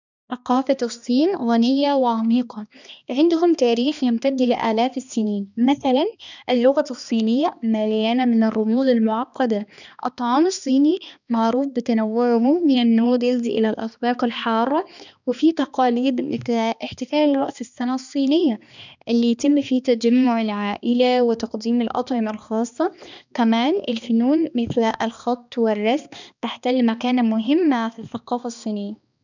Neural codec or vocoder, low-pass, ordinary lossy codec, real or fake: codec, 16 kHz, 2 kbps, X-Codec, HuBERT features, trained on balanced general audio; 7.2 kHz; none; fake